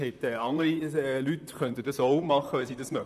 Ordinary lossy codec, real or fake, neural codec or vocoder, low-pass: none; fake; vocoder, 44.1 kHz, 128 mel bands, Pupu-Vocoder; 14.4 kHz